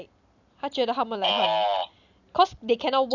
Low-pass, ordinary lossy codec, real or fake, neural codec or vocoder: 7.2 kHz; none; real; none